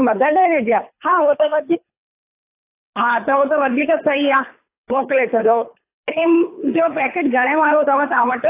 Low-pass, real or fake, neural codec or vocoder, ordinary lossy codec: 3.6 kHz; fake; codec, 24 kHz, 3 kbps, HILCodec; AAC, 24 kbps